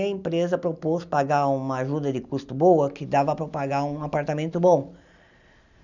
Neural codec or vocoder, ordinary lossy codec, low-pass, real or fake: none; none; 7.2 kHz; real